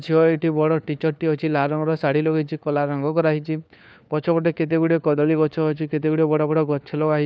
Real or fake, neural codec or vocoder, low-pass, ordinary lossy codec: fake; codec, 16 kHz, 4 kbps, FunCodec, trained on LibriTTS, 50 frames a second; none; none